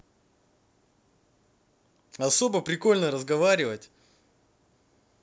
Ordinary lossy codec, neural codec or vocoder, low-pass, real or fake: none; none; none; real